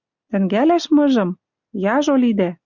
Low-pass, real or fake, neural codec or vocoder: 7.2 kHz; real; none